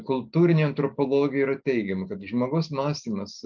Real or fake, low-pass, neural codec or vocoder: real; 7.2 kHz; none